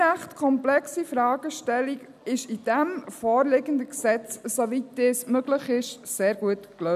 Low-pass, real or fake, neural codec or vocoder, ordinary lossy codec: 14.4 kHz; real; none; none